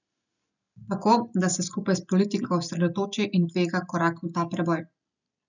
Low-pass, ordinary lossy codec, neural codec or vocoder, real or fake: 7.2 kHz; none; none; real